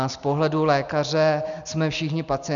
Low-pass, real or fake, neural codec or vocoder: 7.2 kHz; real; none